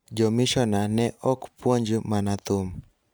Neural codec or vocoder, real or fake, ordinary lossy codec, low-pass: vocoder, 44.1 kHz, 128 mel bands every 512 samples, BigVGAN v2; fake; none; none